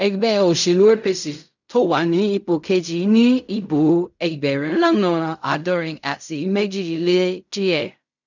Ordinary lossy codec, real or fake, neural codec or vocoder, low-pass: none; fake; codec, 16 kHz in and 24 kHz out, 0.4 kbps, LongCat-Audio-Codec, fine tuned four codebook decoder; 7.2 kHz